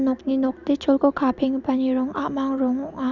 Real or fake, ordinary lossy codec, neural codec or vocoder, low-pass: real; none; none; 7.2 kHz